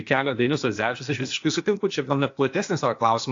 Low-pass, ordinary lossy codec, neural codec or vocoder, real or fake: 7.2 kHz; AAC, 48 kbps; codec, 16 kHz, 0.8 kbps, ZipCodec; fake